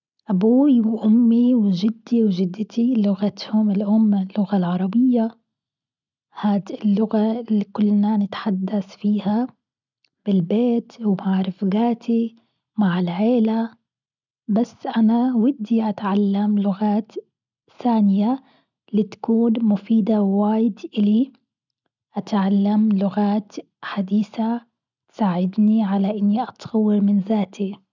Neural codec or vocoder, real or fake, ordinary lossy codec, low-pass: none; real; none; 7.2 kHz